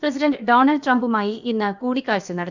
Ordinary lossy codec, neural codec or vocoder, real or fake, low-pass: none; codec, 16 kHz, about 1 kbps, DyCAST, with the encoder's durations; fake; 7.2 kHz